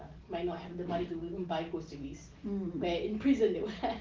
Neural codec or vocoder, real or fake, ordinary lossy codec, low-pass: none; real; Opus, 16 kbps; 7.2 kHz